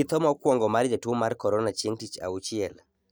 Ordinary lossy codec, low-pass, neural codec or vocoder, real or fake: none; none; none; real